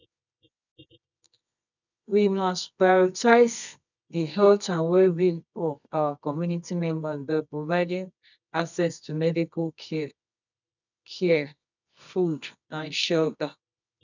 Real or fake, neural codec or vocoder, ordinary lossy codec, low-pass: fake; codec, 24 kHz, 0.9 kbps, WavTokenizer, medium music audio release; none; 7.2 kHz